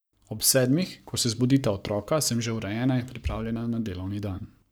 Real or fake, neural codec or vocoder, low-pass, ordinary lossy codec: fake; codec, 44.1 kHz, 7.8 kbps, Pupu-Codec; none; none